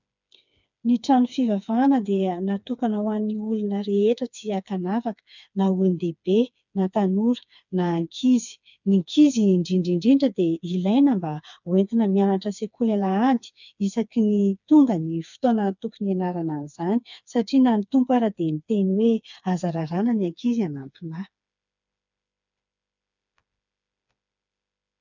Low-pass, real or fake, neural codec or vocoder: 7.2 kHz; fake; codec, 16 kHz, 4 kbps, FreqCodec, smaller model